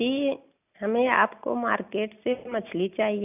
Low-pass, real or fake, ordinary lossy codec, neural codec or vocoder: 3.6 kHz; real; none; none